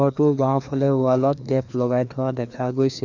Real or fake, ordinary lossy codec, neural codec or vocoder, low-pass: fake; none; codec, 16 kHz, 2 kbps, FreqCodec, larger model; 7.2 kHz